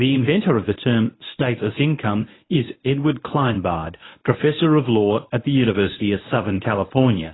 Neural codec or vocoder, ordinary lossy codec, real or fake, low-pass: codec, 24 kHz, 0.9 kbps, WavTokenizer, medium speech release version 1; AAC, 16 kbps; fake; 7.2 kHz